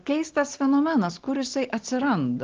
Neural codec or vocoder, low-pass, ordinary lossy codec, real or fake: none; 7.2 kHz; Opus, 16 kbps; real